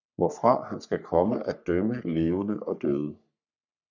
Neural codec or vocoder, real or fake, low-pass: codec, 44.1 kHz, 3.4 kbps, Pupu-Codec; fake; 7.2 kHz